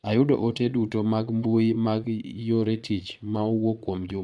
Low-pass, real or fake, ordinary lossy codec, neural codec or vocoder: none; fake; none; vocoder, 22.05 kHz, 80 mel bands, Vocos